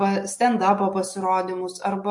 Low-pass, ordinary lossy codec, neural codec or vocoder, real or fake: 10.8 kHz; MP3, 48 kbps; none; real